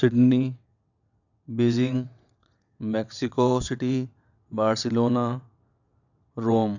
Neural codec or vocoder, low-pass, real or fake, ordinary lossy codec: vocoder, 22.05 kHz, 80 mel bands, WaveNeXt; 7.2 kHz; fake; none